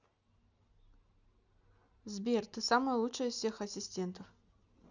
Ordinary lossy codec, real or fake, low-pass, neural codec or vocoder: none; real; 7.2 kHz; none